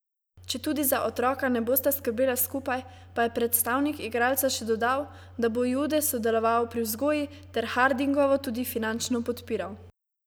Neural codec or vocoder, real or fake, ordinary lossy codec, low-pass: none; real; none; none